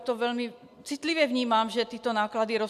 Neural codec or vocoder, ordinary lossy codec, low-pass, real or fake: none; AAC, 96 kbps; 14.4 kHz; real